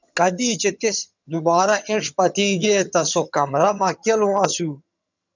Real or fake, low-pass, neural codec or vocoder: fake; 7.2 kHz; vocoder, 22.05 kHz, 80 mel bands, HiFi-GAN